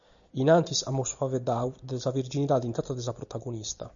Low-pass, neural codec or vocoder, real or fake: 7.2 kHz; none; real